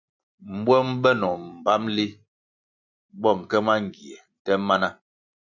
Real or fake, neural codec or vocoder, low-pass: real; none; 7.2 kHz